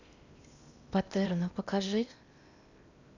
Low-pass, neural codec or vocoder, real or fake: 7.2 kHz; codec, 16 kHz in and 24 kHz out, 0.8 kbps, FocalCodec, streaming, 65536 codes; fake